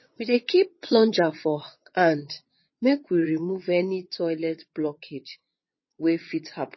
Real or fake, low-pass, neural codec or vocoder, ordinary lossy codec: fake; 7.2 kHz; vocoder, 22.05 kHz, 80 mel bands, WaveNeXt; MP3, 24 kbps